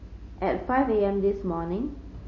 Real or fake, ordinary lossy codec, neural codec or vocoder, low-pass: real; MP3, 32 kbps; none; 7.2 kHz